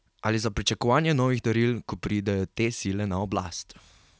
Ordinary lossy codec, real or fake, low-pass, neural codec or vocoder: none; real; none; none